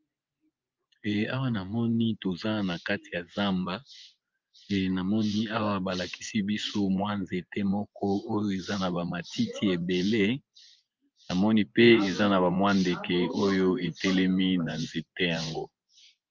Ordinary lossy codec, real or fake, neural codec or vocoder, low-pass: Opus, 24 kbps; fake; vocoder, 24 kHz, 100 mel bands, Vocos; 7.2 kHz